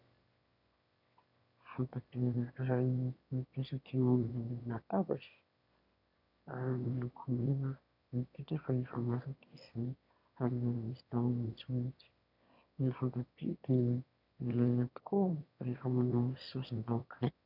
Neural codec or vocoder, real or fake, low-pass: autoencoder, 22.05 kHz, a latent of 192 numbers a frame, VITS, trained on one speaker; fake; 5.4 kHz